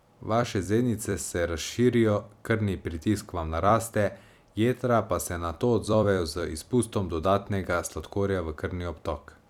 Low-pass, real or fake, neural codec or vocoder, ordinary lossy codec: 19.8 kHz; fake; vocoder, 44.1 kHz, 128 mel bands every 256 samples, BigVGAN v2; none